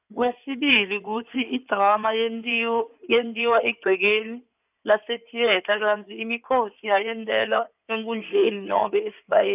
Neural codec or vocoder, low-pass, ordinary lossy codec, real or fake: codec, 16 kHz in and 24 kHz out, 2.2 kbps, FireRedTTS-2 codec; 3.6 kHz; none; fake